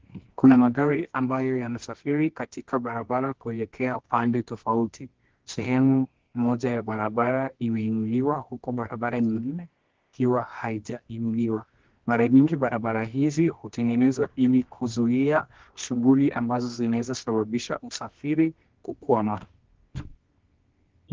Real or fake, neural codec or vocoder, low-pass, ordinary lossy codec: fake; codec, 24 kHz, 0.9 kbps, WavTokenizer, medium music audio release; 7.2 kHz; Opus, 16 kbps